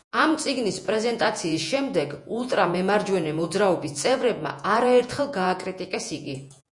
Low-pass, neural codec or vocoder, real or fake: 10.8 kHz; vocoder, 48 kHz, 128 mel bands, Vocos; fake